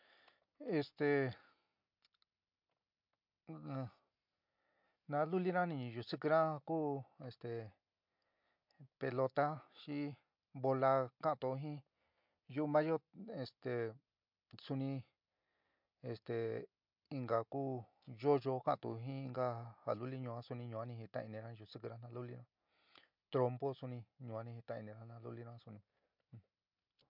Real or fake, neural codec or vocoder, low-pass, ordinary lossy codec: real; none; 5.4 kHz; MP3, 48 kbps